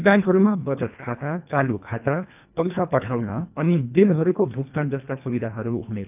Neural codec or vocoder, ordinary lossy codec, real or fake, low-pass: codec, 24 kHz, 1.5 kbps, HILCodec; none; fake; 3.6 kHz